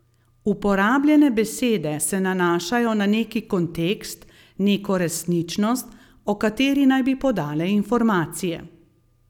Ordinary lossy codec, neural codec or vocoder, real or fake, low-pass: none; none; real; 19.8 kHz